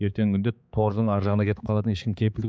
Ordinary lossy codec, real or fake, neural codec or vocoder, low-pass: none; fake; codec, 16 kHz, 4 kbps, X-Codec, HuBERT features, trained on balanced general audio; none